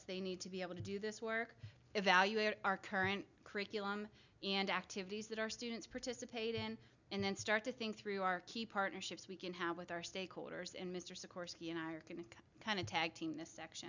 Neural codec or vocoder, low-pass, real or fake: none; 7.2 kHz; real